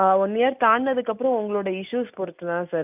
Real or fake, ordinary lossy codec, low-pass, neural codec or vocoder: real; none; 3.6 kHz; none